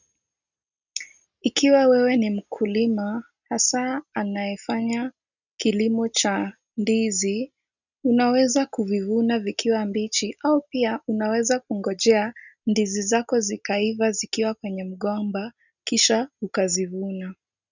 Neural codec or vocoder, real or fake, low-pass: none; real; 7.2 kHz